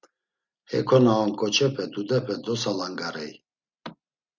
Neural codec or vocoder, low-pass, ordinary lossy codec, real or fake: none; 7.2 kHz; Opus, 64 kbps; real